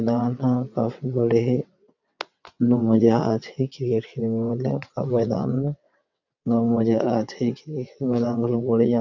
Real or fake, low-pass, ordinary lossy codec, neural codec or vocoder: fake; 7.2 kHz; none; vocoder, 22.05 kHz, 80 mel bands, WaveNeXt